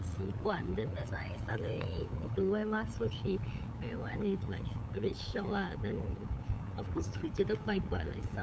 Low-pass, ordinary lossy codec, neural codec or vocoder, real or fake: none; none; codec, 16 kHz, 8 kbps, FunCodec, trained on LibriTTS, 25 frames a second; fake